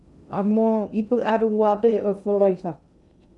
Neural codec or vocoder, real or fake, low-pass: codec, 16 kHz in and 24 kHz out, 0.6 kbps, FocalCodec, streaming, 2048 codes; fake; 10.8 kHz